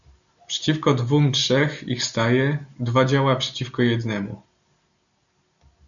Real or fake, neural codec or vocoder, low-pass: real; none; 7.2 kHz